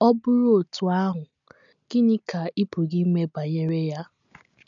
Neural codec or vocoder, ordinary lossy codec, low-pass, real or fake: none; none; 7.2 kHz; real